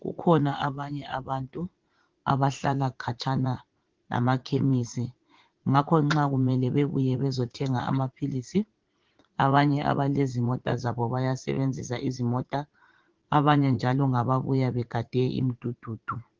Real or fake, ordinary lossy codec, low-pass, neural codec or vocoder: fake; Opus, 16 kbps; 7.2 kHz; vocoder, 44.1 kHz, 80 mel bands, Vocos